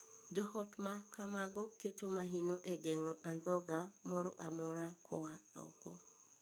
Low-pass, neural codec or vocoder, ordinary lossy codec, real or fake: none; codec, 44.1 kHz, 2.6 kbps, SNAC; none; fake